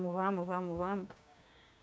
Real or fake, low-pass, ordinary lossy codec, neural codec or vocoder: fake; none; none; codec, 16 kHz, 6 kbps, DAC